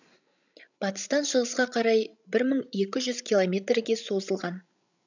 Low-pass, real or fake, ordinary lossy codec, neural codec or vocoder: 7.2 kHz; fake; none; codec, 16 kHz, 16 kbps, FreqCodec, larger model